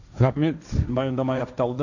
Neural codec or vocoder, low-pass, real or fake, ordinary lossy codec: codec, 16 kHz, 1.1 kbps, Voila-Tokenizer; 7.2 kHz; fake; none